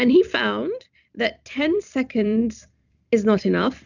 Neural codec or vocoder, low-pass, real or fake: vocoder, 44.1 kHz, 128 mel bands every 256 samples, BigVGAN v2; 7.2 kHz; fake